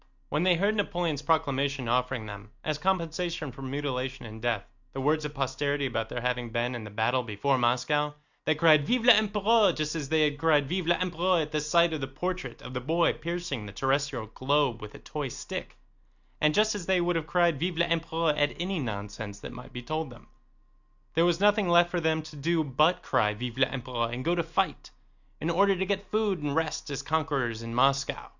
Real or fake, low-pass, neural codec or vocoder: real; 7.2 kHz; none